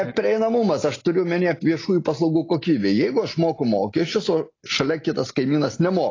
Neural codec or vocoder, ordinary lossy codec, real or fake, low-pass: none; AAC, 32 kbps; real; 7.2 kHz